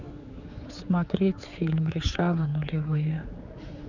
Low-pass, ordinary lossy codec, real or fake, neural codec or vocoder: 7.2 kHz; none; fake; codec, 16 kHz, 4 kbps, X-Codec, HuBERT features, trained on general audio